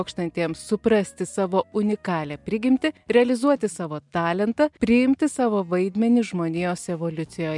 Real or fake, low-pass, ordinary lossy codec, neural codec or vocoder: real; 10.8 kHz; MP3, 96 kbps; none